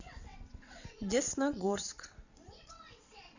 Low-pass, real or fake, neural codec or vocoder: 7.2 kHz; fake; vocoder, 22.05 kHz, 80 mel bands, WaveNeXt